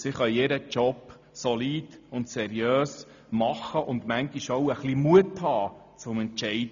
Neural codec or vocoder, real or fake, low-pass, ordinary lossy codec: none; real; 7.2 kHz; none